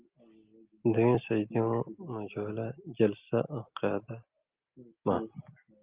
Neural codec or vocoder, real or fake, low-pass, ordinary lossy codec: none; real; 3.6 kHz; Opus, 32 kbps